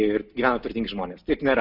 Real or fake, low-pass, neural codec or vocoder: real; 5.4 kHz; none